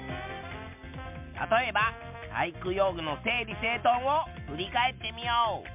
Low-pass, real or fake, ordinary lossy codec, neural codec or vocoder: 3.6 kHz; real; MP3, 32 kbps; none